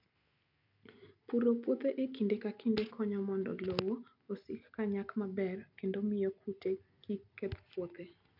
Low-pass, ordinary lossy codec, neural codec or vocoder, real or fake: 5.4 kHz; none; none; real